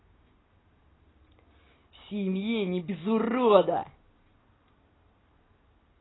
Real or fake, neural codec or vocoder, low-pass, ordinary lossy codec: real; none; 7.2 kHz; AAC, 16 kbps